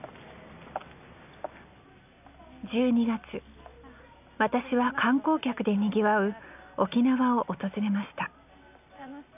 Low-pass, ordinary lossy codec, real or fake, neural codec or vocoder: 3.6 kHz; none; real; none